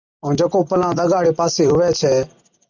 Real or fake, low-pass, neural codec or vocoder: fake; 7.2 kHz; vocoder, 44.1 kHz, 128 mel bands every 256 samples, BigVGAN v2